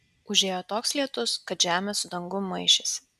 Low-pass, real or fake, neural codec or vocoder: 14.4 kHz; real; none